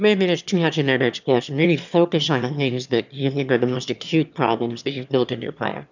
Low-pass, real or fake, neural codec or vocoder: 7.2 kHz; fake; autoencoder, 22.05 kHz, a latent of 192 numbers a frame, VITS, trained on one speaker